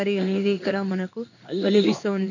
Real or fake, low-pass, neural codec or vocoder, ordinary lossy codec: fake; 7.2 kHz; codec, 16 kHz in and 24 kHz out, 1 kbps, XY-Tokenizer; none